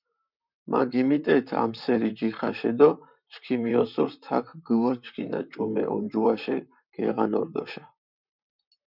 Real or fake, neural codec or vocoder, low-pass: fake; vocoder, 44.1 kHz, 128 mel bands, Pupu-Vocoder; 5.4 kHz